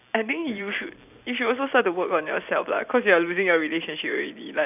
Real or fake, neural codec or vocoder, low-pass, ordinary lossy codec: fake; vocoder, 44.1 kHz, 128 mel bands every 256 samples, BigVGAN v2; 3.6 kHz; none